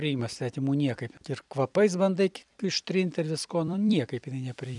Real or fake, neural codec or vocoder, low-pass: fake; vocoder, 44.1 kHz, 128 mel bands every 256 samples, BigVGAN v2; 10.8 kHz